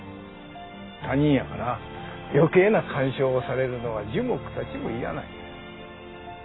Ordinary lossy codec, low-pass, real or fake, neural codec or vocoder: AAC, 16 kbps; 7.2 kHz; real; none